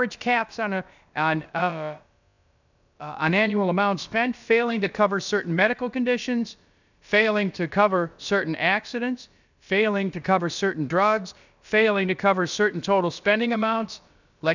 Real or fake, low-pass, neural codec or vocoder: fake; 7.2 kHz; codec, 16 kHz, about 1 kbps, DyCAST, with the encoder's durations